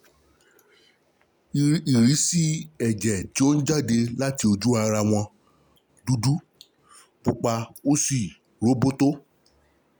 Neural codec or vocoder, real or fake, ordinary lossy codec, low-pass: none; real; none; none